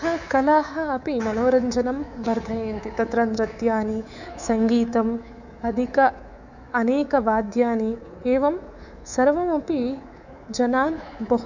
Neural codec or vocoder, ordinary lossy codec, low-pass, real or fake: codec, 24 kHz, 3.1 kbps, DualCodec; none; 7.2 kHz; fake